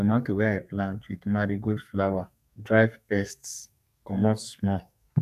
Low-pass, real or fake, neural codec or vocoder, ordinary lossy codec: 14.4 kHz; fake; codec, 32 kHz, 1.9 kbps, SNAC; none